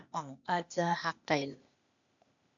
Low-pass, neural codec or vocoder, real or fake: 7.2 kHz; codec, 16 kHz, 0.8 kbps, ZipCodec; fake